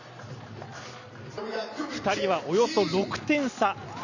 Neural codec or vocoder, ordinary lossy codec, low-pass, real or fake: none; none; 7.2 kHz; real